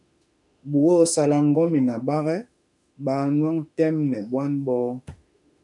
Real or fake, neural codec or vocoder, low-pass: fake; autoencoder, 48 kHz, 32 numbers a frame, DAC-VAE, trained on Japanese speech; 10.8 kHz